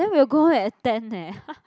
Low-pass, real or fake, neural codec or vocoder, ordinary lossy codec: none; real; none; none